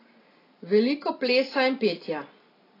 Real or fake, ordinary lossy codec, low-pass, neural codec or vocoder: real; AAC, 24 kbps; 5.4 kHz; none